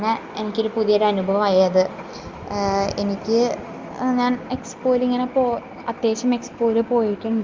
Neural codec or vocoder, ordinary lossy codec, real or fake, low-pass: none; Opus, 32 kbps; real; 7.2 kHz